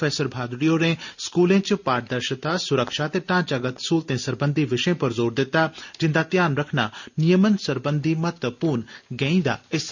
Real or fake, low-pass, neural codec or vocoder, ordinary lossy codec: real; 7.2 kHz; none; none